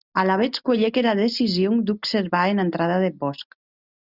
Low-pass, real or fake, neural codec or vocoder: 5.4 kHz; real; none